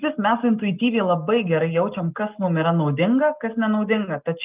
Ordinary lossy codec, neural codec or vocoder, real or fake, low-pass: Opus, 32 kbps; none; real; 3.6 kHz